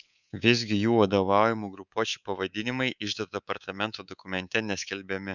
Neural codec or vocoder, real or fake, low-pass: codec, 24 kHz, 3.1 kbps, DualCodec; fake; 7.2 kHz